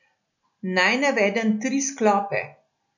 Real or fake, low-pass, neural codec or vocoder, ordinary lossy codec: real; 7.2 kHz; none; none